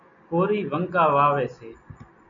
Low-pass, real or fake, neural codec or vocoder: 7.2 kHz; real; none